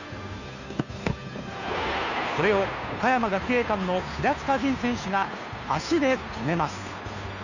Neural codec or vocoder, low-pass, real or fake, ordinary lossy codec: codec, 16 kHz, 2 kbps, FunCodec, trained on Chinese and English, 25 frames a second; 7.2 kHz; fake; none